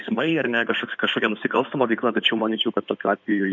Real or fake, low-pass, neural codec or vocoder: fake; 7.2 kHz; codec, 16 kHz in and 24 kHz out, 2.2 kbps, FireRedTTS-2 codec